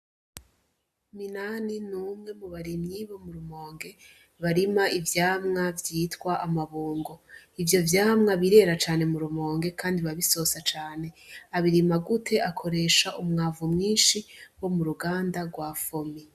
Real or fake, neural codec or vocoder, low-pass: real; none; 14.4 kHz